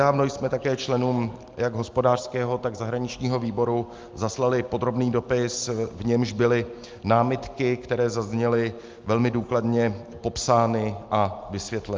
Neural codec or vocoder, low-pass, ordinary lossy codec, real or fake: none; 7.2 kHz; Opus, 24 kbps; real